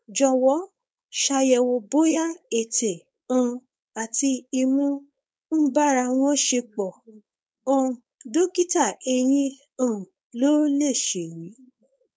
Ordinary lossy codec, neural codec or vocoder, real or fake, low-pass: none; codec, 16 kHz, 4.8 kbps, FACodec; fake; none